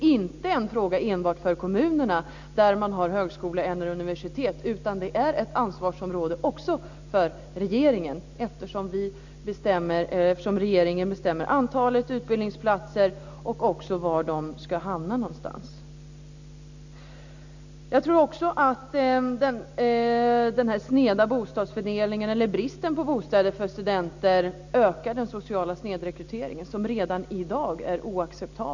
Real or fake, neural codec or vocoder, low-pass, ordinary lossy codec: real; none; 7.2 kHz; none